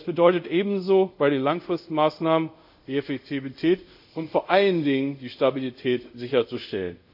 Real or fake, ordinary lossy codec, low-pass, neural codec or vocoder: fake; AAC, 48 kbps; 5.4 kHz; codec, 24 kHz, 0.5 kbps, DualCodec